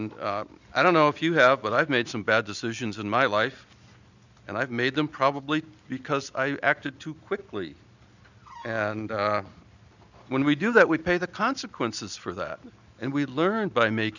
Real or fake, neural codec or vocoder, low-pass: real; none; 7.2 kHz